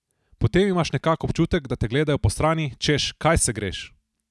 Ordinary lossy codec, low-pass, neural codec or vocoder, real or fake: none; none; none; real